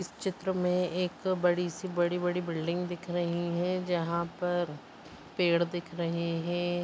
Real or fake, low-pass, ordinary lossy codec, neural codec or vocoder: real; none; none; none